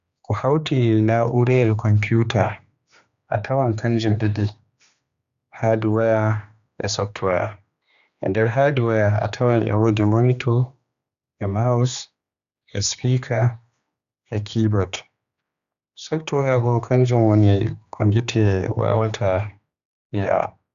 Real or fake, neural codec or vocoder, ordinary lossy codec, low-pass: fake; codec, 16 kHz, 2 kbps, X-Codec, HuBERT features, trained on general audio; Opus, 64 kbps; 7.2 kHz